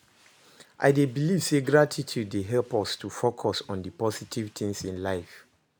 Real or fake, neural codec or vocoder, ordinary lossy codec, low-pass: real; none; none; none